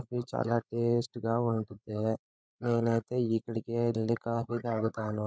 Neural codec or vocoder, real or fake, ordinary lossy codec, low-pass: codec, 16 kHz, 16 kbps, FreqCodec, larger model; fake; none; none